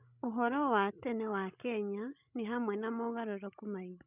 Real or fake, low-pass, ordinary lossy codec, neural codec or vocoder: fake; 3.6 kHz; none; codec, 16 kHz, 16 kbps, FreqCodec, larger model